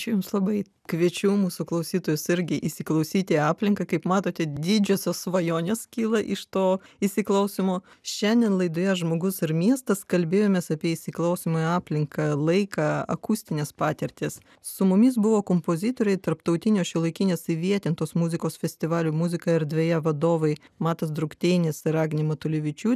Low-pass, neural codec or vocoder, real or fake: 14.4 kHz; none; real